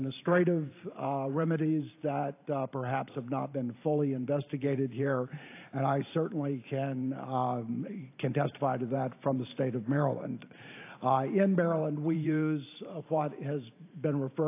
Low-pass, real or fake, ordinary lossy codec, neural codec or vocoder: 3.6 kHz; fake; AAC, 24 kbps; vocoder, 44.1 kHz, 128 mel bands every 512 samples, BigVGAN v2